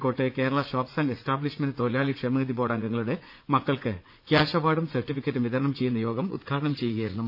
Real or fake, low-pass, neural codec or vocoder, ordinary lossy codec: fake; 5.4 kHz; vocoder, 44.1 kHz, 80 mel bands, Vocos; none